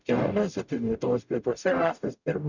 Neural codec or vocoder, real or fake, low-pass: codec, 44.1 kHz, 0.9 kbps, DAC; fake; 7.2 kHz